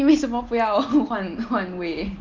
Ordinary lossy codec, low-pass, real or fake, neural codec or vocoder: Opus, 32 kbps; 7.2 kHz; real; none